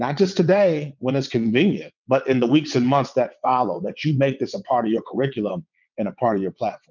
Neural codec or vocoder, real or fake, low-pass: vocoder, 22.05 kHz, 80 mel bands, WaveNeXt; fake; 7.2 kHz